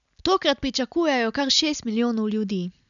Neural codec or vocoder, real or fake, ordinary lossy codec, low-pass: none; real; none; 7.2 kHz